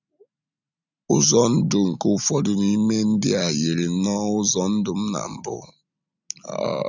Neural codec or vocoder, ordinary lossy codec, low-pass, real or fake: none; none; 7.2 kHz; real